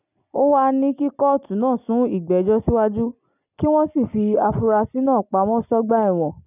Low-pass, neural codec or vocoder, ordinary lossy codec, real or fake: 3.6 kHz; none; none; real